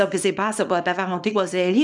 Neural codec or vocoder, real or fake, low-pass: codec, 24 kHz, 0.9 kbps, WavTokenizer, small release; fake; 10.8 kHz